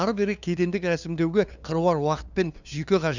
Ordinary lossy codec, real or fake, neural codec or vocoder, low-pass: none; fake; codec, 16 kHz, 2 kbps, FunCodec, trained on LibriTTS, 25 frames a second; 7.2 kHz